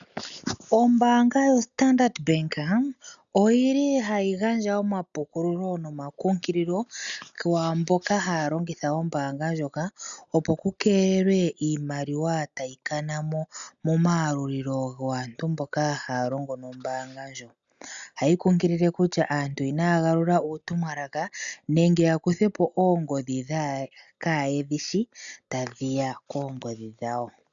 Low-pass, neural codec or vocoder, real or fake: 7.2 kHz; none; real